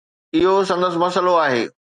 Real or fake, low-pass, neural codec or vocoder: real; 10.8 kHz; none